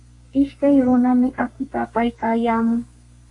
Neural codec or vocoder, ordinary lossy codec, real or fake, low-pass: codec, 32 kHz, 1.9 kbps, SNAC; AAC, 48 kbps; fake; 10.8 kHz